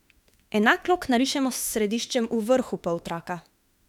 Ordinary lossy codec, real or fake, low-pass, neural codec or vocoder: none; fake; 19.8 kHz; autoencoder, 48 kHz, 32 numbers a frame, DAC-VAE, trained on Japanese speech